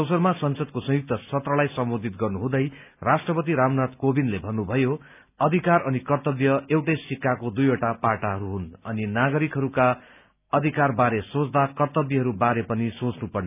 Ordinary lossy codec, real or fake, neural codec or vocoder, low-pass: none; real; none; 3.6 kHz